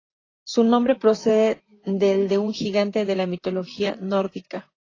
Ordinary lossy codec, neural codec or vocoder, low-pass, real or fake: AAC, 32 kbps; vocoder, 44.1 kHz, 128 mel bands, Pupu-Vocoder; 7.2 kHz; fake